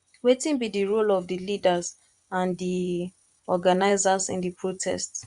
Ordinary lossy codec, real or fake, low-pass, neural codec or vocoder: Opus, 64 kbps; fake; 10.8 kHz; vocoder, 24 kHz, 100 mel bands, Vocos